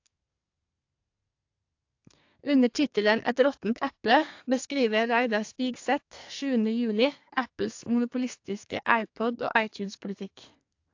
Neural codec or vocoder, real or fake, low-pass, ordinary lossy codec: codec, 32 kHz, 1.9 kbps, SNAC; fake; 7.2 kHz; AAC, 48 kbps